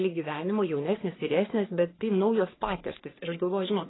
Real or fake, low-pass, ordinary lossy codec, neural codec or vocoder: fake; 7.2 kHz; AAC, 16 kbps; codec, 44.1 kHz, 3.4 kbps, Pupu-Codec